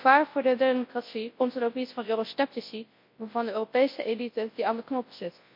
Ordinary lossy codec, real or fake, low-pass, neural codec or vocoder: MP3, 32 kbps; fake; 5.4 kHz; codec, 24 kHz, 0.9 kbps, WavTokenizer, large speech release